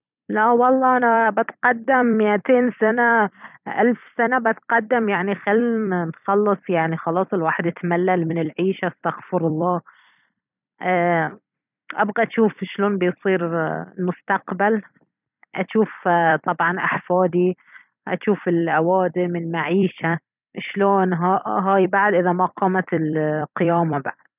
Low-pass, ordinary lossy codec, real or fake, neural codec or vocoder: 3.6 kHz; none; fake; vocoder, 44.1 kHz, 128 mel bands every 256 samples, BigVGAN v2